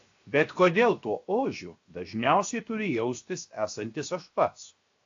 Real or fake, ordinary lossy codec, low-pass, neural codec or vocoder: fake; AAC, 48 kbps; 7.2 kHz; codec, 16 kHz, 0.7 kbps, FocalCodec